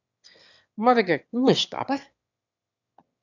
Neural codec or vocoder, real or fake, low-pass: autoencoder, 22.05 kHz, a latent of 192 numbers a frame, VITS, trained on one speaker; fake; 7.2 kHz